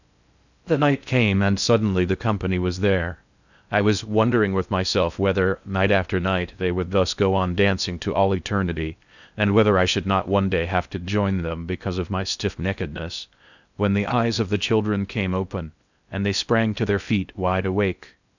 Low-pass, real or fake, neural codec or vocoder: 7.2 kHz; fake; codec, 16 kHz in and 24 kHz out, 0.6 kbps, FocalCodec, streaming, 4096 codes